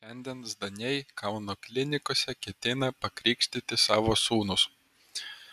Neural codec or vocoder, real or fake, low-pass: none; real; 14.4 kHz